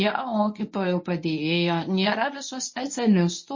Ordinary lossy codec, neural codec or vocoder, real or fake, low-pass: MP3, 32 kbps; codec, 24 kHz, 0.9 kbps, WavTokenizer, medium speech release version 1; fake; 7.2 kHz